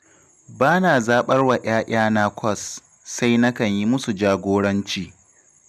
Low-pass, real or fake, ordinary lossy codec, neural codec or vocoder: 14.4 kHz; real; none; none